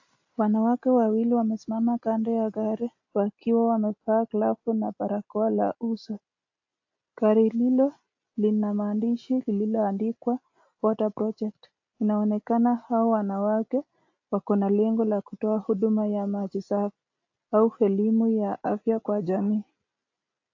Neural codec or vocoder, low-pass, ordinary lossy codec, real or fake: none; 7.2 kHz; AAC, 48 kbps; real